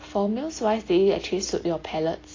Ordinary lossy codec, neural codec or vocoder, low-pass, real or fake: AAC, 32 kbps; none; 7.2 kHz; real